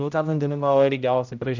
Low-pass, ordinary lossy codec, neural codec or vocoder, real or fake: 7.2 kHz; none; codec, 16 kHz, 0.5 kbps, X-Codec, HuBERT features, trained on general audio; fake